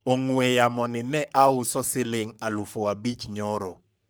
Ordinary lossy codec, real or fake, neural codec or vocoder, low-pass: none; fake; codec, 44.1 kHz, 3.4 kbps, Pupu-Codec; none